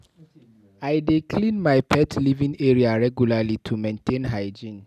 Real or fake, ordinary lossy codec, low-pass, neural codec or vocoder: real; none; 14.4 kHz; none